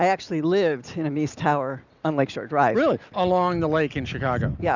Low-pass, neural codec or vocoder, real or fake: 7.2 kHz; none; real